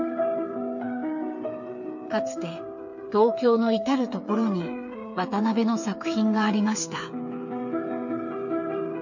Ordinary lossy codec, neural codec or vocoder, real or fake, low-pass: none; codec, 16 kHz, 8 kbps, FreqCodec, smaller model; fake; 7.2 kHz